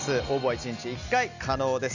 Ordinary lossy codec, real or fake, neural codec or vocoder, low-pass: none; real; none; 7.2 kHz